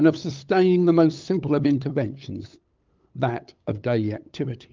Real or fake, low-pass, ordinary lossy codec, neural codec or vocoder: fake; 7.2 kHz; Opus, 24 kbps; codec, 16 kHz, 8 kbps, FunCodec, trained on LibriTTS, 25 frames a second